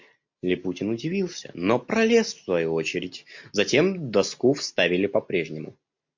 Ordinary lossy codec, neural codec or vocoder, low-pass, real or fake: MP3, 48 kbps; none; 7.2 kHz; real